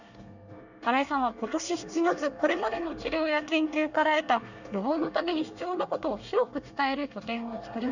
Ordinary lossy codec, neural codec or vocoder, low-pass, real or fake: none; codec, 24 kHz, 1 kbps, SNAC; 7.2 kHz; fake